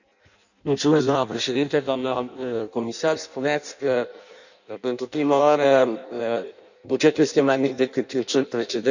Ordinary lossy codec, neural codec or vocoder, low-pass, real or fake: none; codec, 16 kHz in and 24 kHz out, 0.6 kbps, FireRedTTS-2 codec; 7.2 kHz; fake